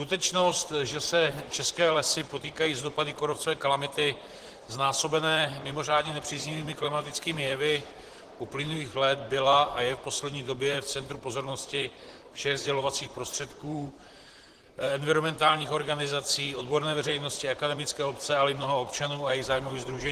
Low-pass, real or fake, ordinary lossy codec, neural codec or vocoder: 14.4 kHz; fake; Opus, 16 kbps; vocoder, 44.1 kHz, 128 mel bands, Pupu-Vocoder